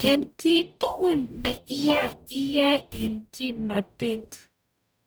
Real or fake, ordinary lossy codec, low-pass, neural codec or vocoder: fake; none; none; codec, 44.1 kHz, 0.9 kbps, DAC